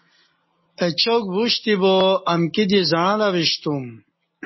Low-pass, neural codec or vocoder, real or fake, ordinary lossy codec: 7.2 kHz; none; real; MP3, 24 kbps